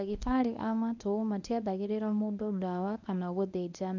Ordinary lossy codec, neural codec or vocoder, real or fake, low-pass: none; codec, 24 kHz, 0.9 kbps, WavTokenizer, medium speech release version 1; fake; 7.2 kHz